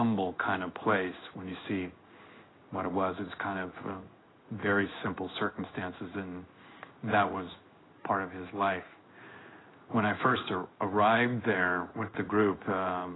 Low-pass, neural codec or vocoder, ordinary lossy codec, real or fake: 7.2 kHz; codec, 16 kHz in and 24 kHz out, 1 kbps, XY-Tokenizer; AAC, 16 kbps; fake